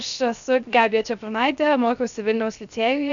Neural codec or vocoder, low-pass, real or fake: codec, 16 kHz, 0.7 kbps, FocalCodec; 7.2 kHz; fake